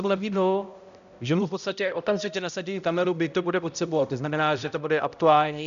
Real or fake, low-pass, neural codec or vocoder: fake; 7.2 kHz; codec, 16 kHz, 0.5 kbps, X-Codec, HuBERT features, trained on balanced general audio